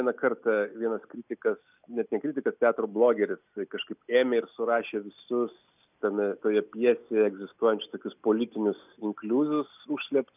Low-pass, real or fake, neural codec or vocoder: 3.6 kHz; real; none